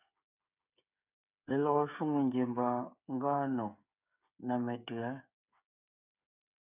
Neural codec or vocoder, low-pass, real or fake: codec, 16 kHz, 8 kbps, FreqCodec, smaller model; 3.6 kHz; fake